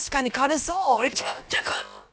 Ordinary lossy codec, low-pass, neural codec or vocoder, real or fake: none; none; codec, 16 kHz, about 1 kbps, DyCAST, with the encoder's durations; fake